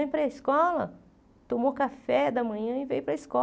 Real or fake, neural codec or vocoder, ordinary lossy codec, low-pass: real; none; none; none